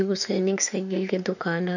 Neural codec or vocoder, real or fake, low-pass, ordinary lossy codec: autoencoder, 48 kHz, 32 numbers a frame, DAC-VAE, trained on Japanese speech; fake; 7.2 kHz; none